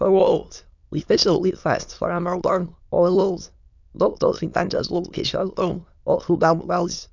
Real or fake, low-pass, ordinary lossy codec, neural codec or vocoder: fake; 7.2 kHz; none; autoencoder, 22.05 kHz, a latent of 192 numbers a frame, VITS, trained on many speakers